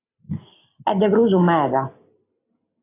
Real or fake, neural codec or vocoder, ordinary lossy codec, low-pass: real; none; AAC, 24 kbps; 3.6 kHz